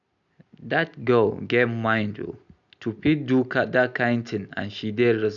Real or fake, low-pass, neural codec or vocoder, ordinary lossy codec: real; 7.2 kHz; none; none